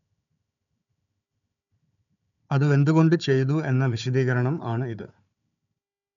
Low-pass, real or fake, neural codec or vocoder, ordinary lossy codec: 7.2 kHz; fake; codec, 16 kHz, 4 kbps, FunCodec, trained on Chinese and English, 50 frames a second; MP3, 96 kbps